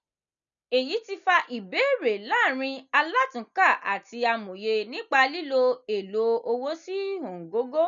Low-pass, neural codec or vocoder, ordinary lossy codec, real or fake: 7.2 kHz; none; none; real